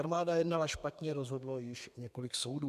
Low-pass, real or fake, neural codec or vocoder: 14.4 kHz; fake; codec, 32 kHz, 1.9 kbps, SNAC